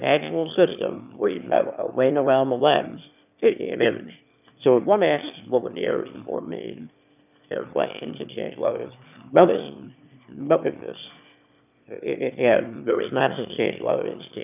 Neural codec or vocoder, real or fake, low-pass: autoencoder, 22.05 kHz, a latent of 192 numbers a frame, VITS, trained on one speaker; fake; 3.6 kHz